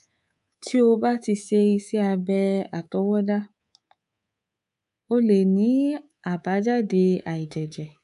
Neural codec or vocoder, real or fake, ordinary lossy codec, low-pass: codec, 24 kHz, 3.1 kbps, DualCodec; fake; AAC, 96 kbps; 10.8 kHz